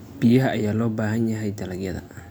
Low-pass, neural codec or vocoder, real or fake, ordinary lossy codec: none; none; real; none